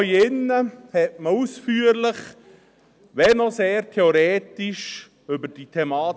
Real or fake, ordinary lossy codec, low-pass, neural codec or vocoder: real; none; none; none